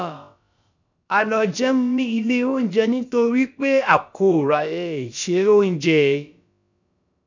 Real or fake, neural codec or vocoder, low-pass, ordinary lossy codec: fake; codec, 16 kHz, about 1 kbps, DyCAST, with the encoder's durations; 7.2 kHz; none